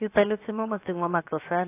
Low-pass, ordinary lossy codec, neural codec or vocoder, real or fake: 3.6 kHz; AAC, 24 kbps; codec, 16 kHz, 0.7 kbps, FocalCodec; fake